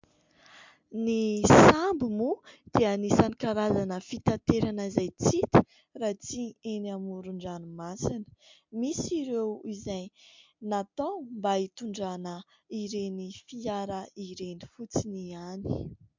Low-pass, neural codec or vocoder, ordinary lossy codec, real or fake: 7.2 kHz; none; MP3, 64 kbps; real